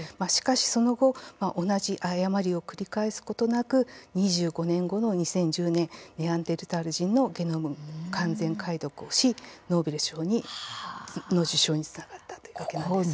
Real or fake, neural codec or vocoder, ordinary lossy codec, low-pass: real; none; none; none